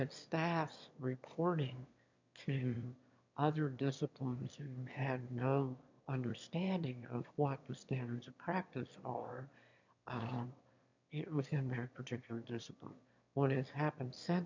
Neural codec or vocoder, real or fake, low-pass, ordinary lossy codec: autoencoder, 22.05 kHz, a latent of 192 numbers a frame, VITS, trained on one speaker; fake; 7.2 kHz; MP3, 64 kbps